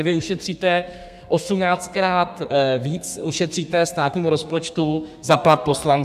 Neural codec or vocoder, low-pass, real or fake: codec, 32 kHz, 1.9 kbps, SNAC; 14.4 kHz; fake